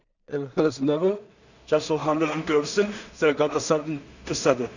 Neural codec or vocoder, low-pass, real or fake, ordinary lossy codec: codec, 16 kHz in and 24 kHz out, 0.4 kbps, LongCat-Audio-Codec, two codebook decoder; 7.2 kHz; fake; none